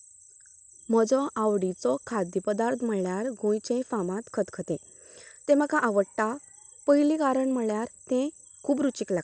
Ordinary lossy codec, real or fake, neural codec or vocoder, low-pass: none; real; none; none